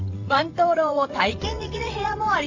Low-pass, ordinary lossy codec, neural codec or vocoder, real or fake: 7.2 kHz; AAC, 48 kbps; vocoder, 22.05 kHz, 80 mel bands, WaveNeXt; fake